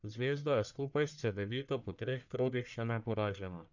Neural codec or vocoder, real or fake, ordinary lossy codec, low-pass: codec, 44.1 kHz, 1.7 kbps, Pupu-Codec; fake; none; 7.2 kHz